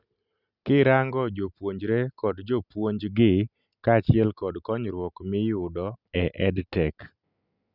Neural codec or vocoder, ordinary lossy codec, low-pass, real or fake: none; none; 5.4 kHz; real